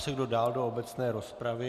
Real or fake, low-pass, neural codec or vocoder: real; 14.4 kHz; none